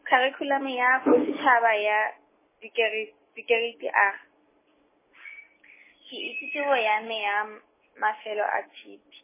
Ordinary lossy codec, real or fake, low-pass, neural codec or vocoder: MP3, 16 kbps; real; 3.6 kHz; none